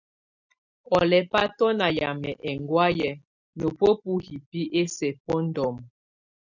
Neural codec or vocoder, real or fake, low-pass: none; real; 7.2 kHz